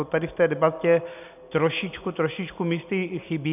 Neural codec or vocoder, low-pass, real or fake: none; 3.6 kHz; real